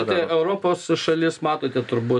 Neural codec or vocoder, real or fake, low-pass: none; real; 10.8 kHz